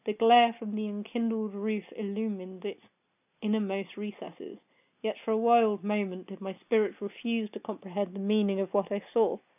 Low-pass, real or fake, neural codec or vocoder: 3.6 kHz; real; none